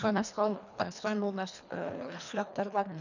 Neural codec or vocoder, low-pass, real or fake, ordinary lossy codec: codec, 24 kHz, 1.5 kbps, HILCodec; 7.2 kHz; fake; none